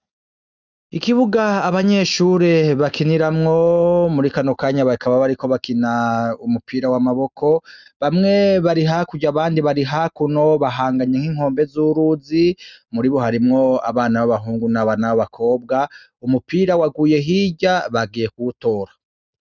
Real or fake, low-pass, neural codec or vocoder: real; 7.2 kHz; none